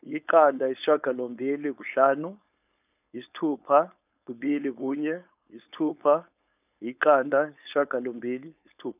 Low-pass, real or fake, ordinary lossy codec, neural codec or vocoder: 3.6 kHz; fake; none; codec, 16 kHz, 4.8 kbps, FACodec